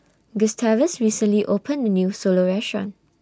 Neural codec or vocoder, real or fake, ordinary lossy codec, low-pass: none; real; none; none